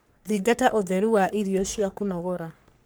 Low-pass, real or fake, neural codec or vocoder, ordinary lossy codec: none; fake; codec, 44.1 kHz, 3.4 kbps, Pupu-Codec; none